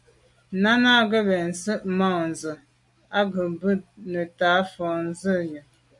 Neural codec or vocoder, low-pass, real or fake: none; 10.8 kHz; real